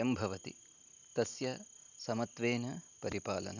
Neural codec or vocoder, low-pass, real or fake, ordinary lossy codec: none; 7.2 kHz; real; none